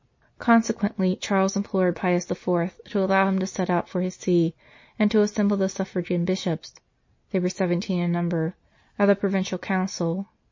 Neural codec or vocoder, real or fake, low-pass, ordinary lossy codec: none; real; 7.2 kHz; MP3, 32 kbps